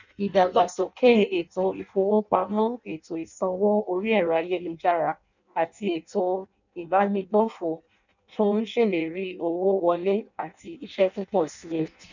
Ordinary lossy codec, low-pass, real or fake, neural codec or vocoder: none; 7.2 kHz; fake; codec, 16 kHz in and 24 kHz out, 0.6 kbps, FireRedTTS-2 codec